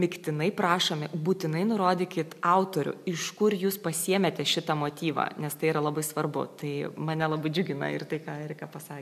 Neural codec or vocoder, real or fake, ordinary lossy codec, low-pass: none; real; AAC, 96 kbps; 14.4 kHz